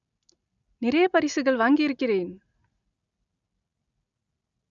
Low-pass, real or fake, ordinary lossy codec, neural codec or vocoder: 7.2 kHz; real; none; none